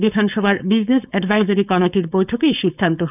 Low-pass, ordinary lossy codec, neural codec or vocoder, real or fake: 3.6 kHz; none; codec, 16 kHz, 4.8 kbps, FACodec; fake